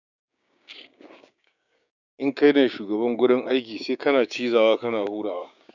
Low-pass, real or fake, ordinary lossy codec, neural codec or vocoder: 7.2 kHz; fake; none; codec, 16 kHz, 6 kbps, DAC